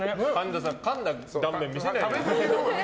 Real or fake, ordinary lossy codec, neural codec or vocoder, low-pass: real; none; none; none